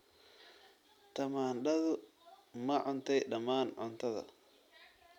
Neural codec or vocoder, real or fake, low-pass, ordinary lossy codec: none; real; 19.8 kHz; none